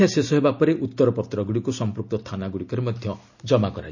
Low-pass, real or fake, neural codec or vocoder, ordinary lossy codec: 7.2 kHz; real; none; none